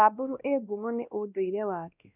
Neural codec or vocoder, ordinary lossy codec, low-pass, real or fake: codec, 16 kHz, 1 kbps, X-Codec, WavLM features, trained on Multilingual LibriSpeech; none; 3.6 kHz; fake